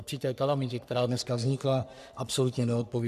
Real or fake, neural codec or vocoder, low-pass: fake; codec, 44.1 kHz, 3.4 kbps, Pupu-Codec; 14.4 kHz